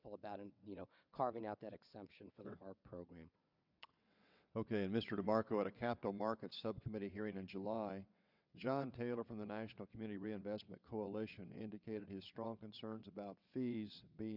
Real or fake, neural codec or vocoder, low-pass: fake; vocoder, 22.05 kHz, 80 mel bands, WaveNeXt; 5.4 kHz